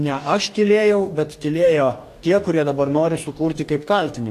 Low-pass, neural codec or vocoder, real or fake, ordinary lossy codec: 14.4 kHz; codec, 44.1 kHz, 2.6 kbps, DAC; fake; AAC, 64 kbps